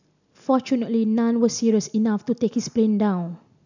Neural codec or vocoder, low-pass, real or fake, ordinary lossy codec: none; 7.2 kHz; real; none